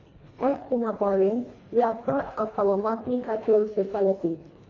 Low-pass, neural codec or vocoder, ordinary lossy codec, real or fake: 7.2 kHz; codec, 24 kHz, 1.5 kbps, HILCodec; AAC, 32 kbps; fake